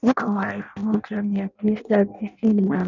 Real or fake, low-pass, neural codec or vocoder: fake; 7.2 kHz; codec, 16 kHz in and 24 kHz out, 0.6 kbps, FireRedTTS-2 codec